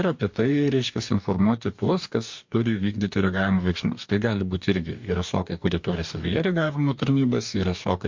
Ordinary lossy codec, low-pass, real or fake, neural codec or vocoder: MP3, 48 kbps; 7.2 kHz; fake; codec, 44.1 kHz, 2.6 kbps, DAC